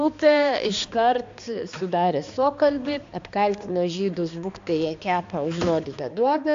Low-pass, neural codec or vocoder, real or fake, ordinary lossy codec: 7.2 kHz; codec, 16 kHz, 2 kbps, X-Codec, HuBERT features, trained on balanced general audio; fake; AAC, 64 kbps